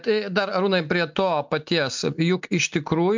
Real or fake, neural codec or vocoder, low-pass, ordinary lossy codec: real; none; 7.2 kHz; MP3, 64 kbps